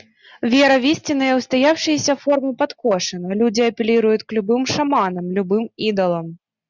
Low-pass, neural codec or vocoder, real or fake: 7.2 kHz; none; real